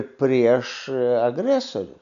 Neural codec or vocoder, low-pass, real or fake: none; 7.2 kHz; real